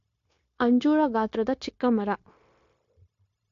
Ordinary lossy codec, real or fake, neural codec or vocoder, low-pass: AAC, 48 kbps; fake; codec, 16 kHz, 0.9 kbps, LongCat-Audio-Codec; 7.2 kHz